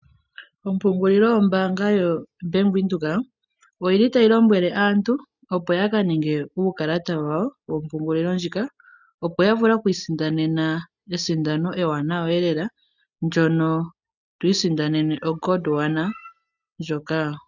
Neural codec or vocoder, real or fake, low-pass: none; real; 7.2 kHz